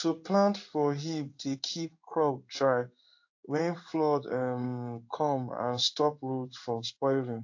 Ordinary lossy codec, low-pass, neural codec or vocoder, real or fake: AAC, 48 kbps; 7.2 kHz; codec, 16 kHz in and 24 kHz out, 1 kbps, XY-Tokenizer; fake